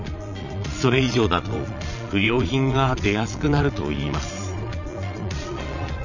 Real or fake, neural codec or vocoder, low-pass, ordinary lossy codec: fake; vocoder, 22.05 kHz, 80 mel bands, Vocos; 7.2 kHz; none